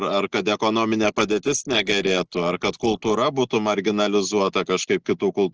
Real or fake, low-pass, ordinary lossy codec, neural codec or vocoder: real; 7.2 kHz; Opus, 16 kbps; none